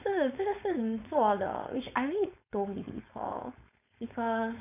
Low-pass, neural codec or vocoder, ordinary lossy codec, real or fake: 3.6 kHz; codec, 16 kHz, 4.8 kbps, FACodec; none; fake